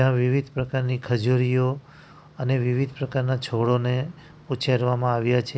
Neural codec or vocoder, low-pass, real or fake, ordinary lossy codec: none; none; real; none